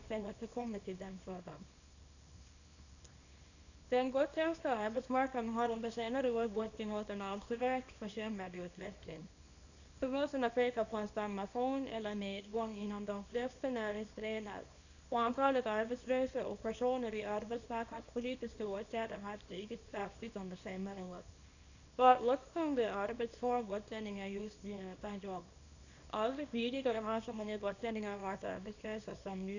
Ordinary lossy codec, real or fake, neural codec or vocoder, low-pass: none; fake; codec, 24 kHz, 0.9 kbps, WavTokenizer, small release; 7.2 kHz